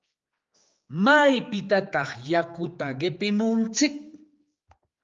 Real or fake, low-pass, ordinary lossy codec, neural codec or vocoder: fake; 7.2 kHz; Opus, 32 kbps; codec, 16 kHz, 4 kbps, X-Codec, HuBERT features, trained on general audio